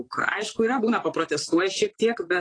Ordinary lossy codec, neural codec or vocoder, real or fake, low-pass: AAC, 32 kbps; vocoder, 44.1 kHz, 128 mel bands, Pupu-Vocoder; fake; 9.9 kHz